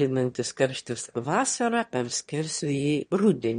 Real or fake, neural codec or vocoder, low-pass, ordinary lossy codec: fake; autoencoder, 22.05 kHz, a latent of 192 numbers a frame, VITS, trained on one speaker; 9.9 kHz; MP3, 48 kbps